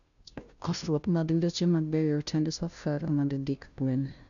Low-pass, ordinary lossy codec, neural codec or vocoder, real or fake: 7.2 kHz; none; codec, 16 kHz, 0.5 kbps, FunCodec, trained on Chinese and English, 25 frames a second; fake